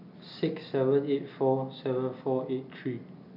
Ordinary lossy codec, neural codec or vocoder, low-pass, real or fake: none; none; 5.4 kHz; real